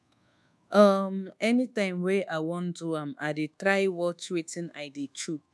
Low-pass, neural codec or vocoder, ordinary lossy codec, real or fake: none; codec, 24 kHz, 1.2 kbps, DualCodec; none; fake